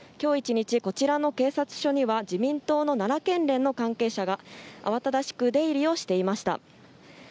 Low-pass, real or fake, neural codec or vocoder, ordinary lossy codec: none; real; none; none